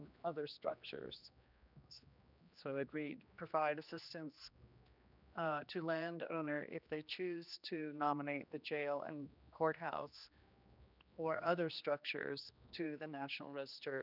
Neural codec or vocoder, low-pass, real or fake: codec, 16 kHz, 2 kbps, X-Codec, HuBERT features, trained on general audio; 5.4 kHz; fake